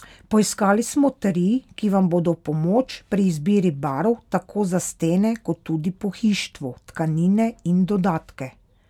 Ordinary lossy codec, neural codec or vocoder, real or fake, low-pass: none; none; real; 19.8 kHz